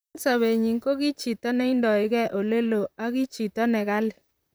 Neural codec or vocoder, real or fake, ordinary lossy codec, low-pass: vocoder, 44.1 kHz, 128 mel bands, Pupu-Vocoder; fake; none; none